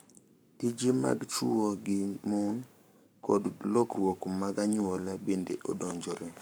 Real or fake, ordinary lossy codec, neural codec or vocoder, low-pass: fake; none; codec, 44.1 kHz, 7.8 kbps, Pupu-Codec; none